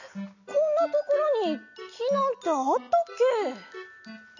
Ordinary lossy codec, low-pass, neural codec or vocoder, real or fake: none; 7.2 kHz; none; real